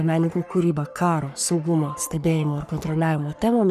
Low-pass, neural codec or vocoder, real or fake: 14.4 kHz; codec, 44.1 kHz, 3.4 kbps, Pupu-Codec; fake